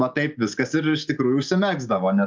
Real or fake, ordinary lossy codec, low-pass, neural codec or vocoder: real; Opus, 24 kbps; 7.2 kHz; none